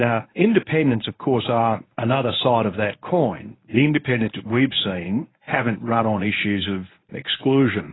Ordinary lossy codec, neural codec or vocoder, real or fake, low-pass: AAC, 16 kbps; codec, 24 kHz, 0.9 kbps, WavTokenizer, medium speech release version 1; fake; 7.2 kHz